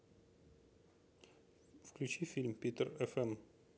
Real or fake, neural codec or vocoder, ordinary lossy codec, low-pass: real; none; none; none